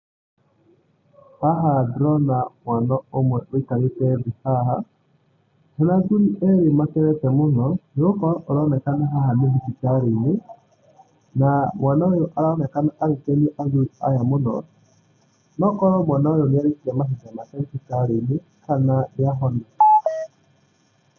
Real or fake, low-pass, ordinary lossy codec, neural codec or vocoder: real; 7.2 kHz; Opus, 64 kbps; none